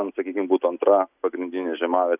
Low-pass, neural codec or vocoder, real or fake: 3.6 kHz; none; real